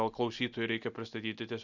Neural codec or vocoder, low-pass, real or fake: none; 7.2 kHz; real